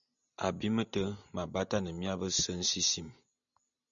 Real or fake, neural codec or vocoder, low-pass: real; none; 7.2 kHz